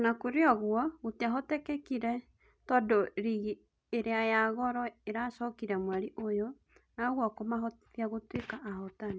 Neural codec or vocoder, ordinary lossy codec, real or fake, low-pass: none; none; real; none